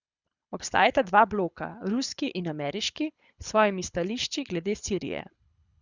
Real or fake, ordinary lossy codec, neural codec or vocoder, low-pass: fake; Opus, 64 kbps; codec, 24 kHz, 6 kbps, HILCodec; 7.2 kHz